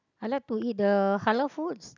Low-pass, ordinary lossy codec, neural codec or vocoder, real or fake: 7.2 kHz; none; none; real